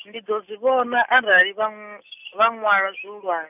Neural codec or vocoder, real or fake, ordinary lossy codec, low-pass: none; real; none; 3.6 kHz